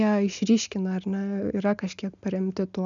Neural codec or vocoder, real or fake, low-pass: none; real; 7.2 kHz